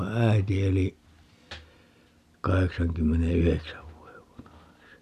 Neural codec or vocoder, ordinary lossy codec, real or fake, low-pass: none; none; real; 14.4 kHz